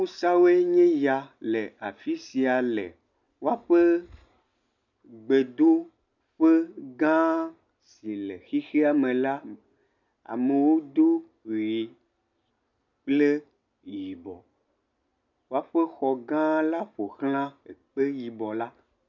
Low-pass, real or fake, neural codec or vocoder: 7.2 kHz; real; none